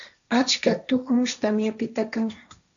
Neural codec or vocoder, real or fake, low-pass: codec, 16 kHz, 1.1 kbps, Voila-Tokenizer; fake; 7.2 kHz